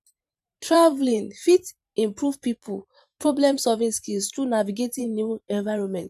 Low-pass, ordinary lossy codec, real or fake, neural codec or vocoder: 14.4 kHz; none; fake; vocoder, 44.1 kHz, 128 mel bands every 512 samples, BigVGAN v2